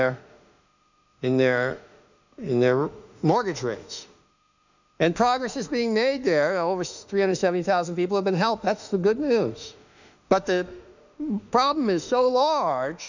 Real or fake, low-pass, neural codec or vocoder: fake; 7.2 kHz; autoencoder, 48 kHz, 32 numbers a frame, DAC-VAE, trained on Japanese speech